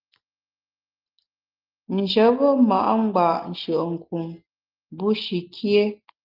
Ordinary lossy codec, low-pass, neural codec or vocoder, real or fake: Opus, 32 kbps; 5.4 kHz; none; real